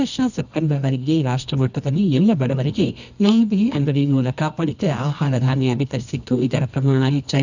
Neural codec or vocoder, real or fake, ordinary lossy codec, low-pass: codec, 24 kHz, 0.9 kbps, WavTokenizer, medium music audio release; fake; none; 7.2 kHz